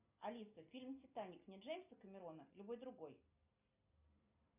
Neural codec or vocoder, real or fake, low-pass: none; real; 3.6 kHz